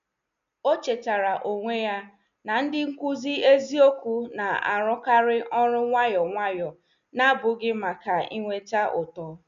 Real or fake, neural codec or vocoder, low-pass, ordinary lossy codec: real; none; 7.2 kHz; none